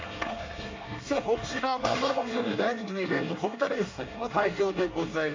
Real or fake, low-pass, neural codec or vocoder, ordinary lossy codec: fake; 7.2 kHz; codec, 24 kHz, 1 kbps, SNAC; MP3, 48 kbps